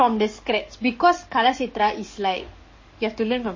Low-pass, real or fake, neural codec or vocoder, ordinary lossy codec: 7.2 kHz; fake; codec, 44.1 kHz, 7.8 kbps, DAC; MP3, 32 kbps